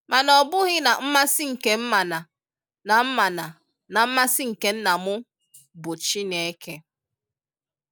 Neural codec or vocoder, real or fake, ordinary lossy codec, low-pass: none; real; none; none